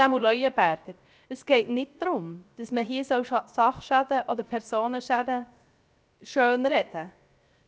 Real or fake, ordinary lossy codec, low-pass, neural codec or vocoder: fake; none; none; codec, 16 kHz, 0.7 kbps, FocalCodec